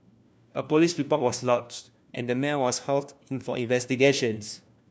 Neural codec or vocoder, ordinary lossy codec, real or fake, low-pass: codec, 16 kHz, 1 kbps, FunCodec, trained on LibriTTS, 50 frames a second; none; fake; none